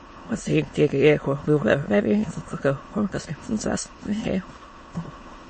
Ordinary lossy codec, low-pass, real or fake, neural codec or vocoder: MP3, 32 kbps; 9.9 kHz; fake; autoencoder, 22.05 kHz, a latent of 192 numbers a frame, VITS, trained on many speakers